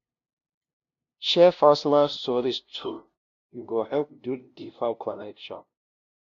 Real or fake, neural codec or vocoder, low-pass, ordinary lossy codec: fake; codec, 16 kHz, 0.5 kbps, FunCodec, trained on LibriTTS, 25 frames a second; 7.2 kHz; AAC, 64 kbps